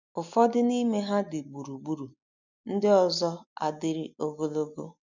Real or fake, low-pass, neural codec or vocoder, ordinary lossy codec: real; 7.2 kHz; none; none